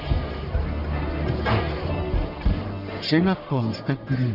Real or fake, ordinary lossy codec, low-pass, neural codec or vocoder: fake; none; 5.4 kHz; codec, 44.1 kHz, 1.7 kbps, Pupu-Codec